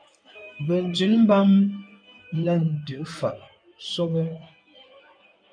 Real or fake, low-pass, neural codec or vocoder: fake; 9.9 kHz; codec, 16 kHz in and 24 kHz out, 2.2 kbps, FireRedTTS-2 codec